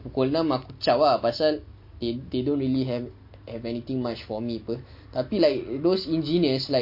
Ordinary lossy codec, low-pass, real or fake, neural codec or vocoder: MP3, 32 kbps; 5.4 kHz; real; none